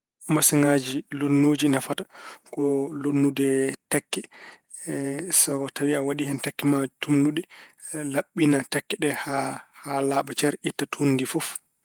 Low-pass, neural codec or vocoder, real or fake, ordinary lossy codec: 19.8 kHz; vocoder, 48 kHz, 128 mel bands, Vocos; fake; Opus, 32 kbps